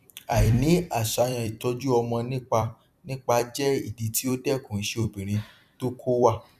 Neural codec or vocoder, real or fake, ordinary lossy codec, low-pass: vocoder, 44.1 kHz, 128 mel bands every 256 samples, BigVGAN v2; fake; none; 14.4 kHz